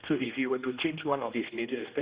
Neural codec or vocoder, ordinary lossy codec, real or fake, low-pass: codec, 16 kHz, 1 kbps, X-Codec, HuBERT features, trained on general audio; Opus, 64 kbps; fake; 3.6 kHz